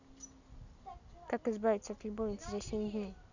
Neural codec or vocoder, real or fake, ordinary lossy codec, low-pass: none; real; none; 7.2 kHz